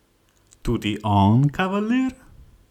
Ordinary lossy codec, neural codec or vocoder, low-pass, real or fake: none; none; 19.8 kHz; real